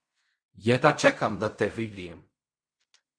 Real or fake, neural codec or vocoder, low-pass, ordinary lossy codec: fake; codec, 16 kHz in and 24 kHz out, 0.4 kbps, LongCat-Audio-Codec, fine tuned four codebook decoder; 9.9 kHz; Opus, 64 kbps